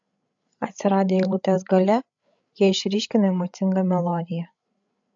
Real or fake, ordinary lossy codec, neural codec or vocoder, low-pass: fake; AAC, 64 kbps; codec, 16 kHz, 8 kbps, FreqCodec, larger model; 7.2 kHz